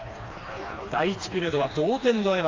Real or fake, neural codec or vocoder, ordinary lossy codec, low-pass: fake; codec, 16 kHz, 2 kbps, FreqCodec, smaller model; AAC, 32 kbps; 7.2 kHz